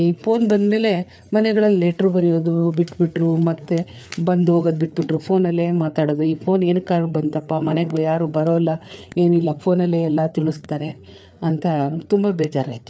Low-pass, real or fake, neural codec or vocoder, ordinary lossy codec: none; fake; codec, 16 kHz, 4 kbps, FreqCodec, larger model; none